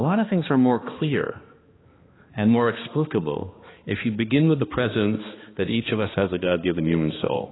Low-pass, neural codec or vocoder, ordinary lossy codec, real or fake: 7.2 kHz; codec, 16 kHz, 2 kbps, X-Codec, HuBERT features, trained on balanced general audio; AAC, 16 kbps; fake